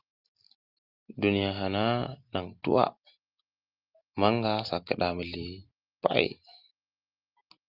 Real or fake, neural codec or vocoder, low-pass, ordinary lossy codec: real; none; 5.4 kHz; Opus, 32 kbps